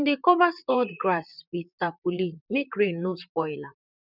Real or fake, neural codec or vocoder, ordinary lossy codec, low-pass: fake; vocoder, 44.1 kHz, 128 mel bands, Pupu-Vocoder; none; 5.4 kHz